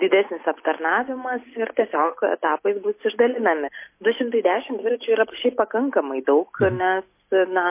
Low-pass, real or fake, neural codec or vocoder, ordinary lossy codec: 3.6 kHz; real; none; MP3, 24 kbps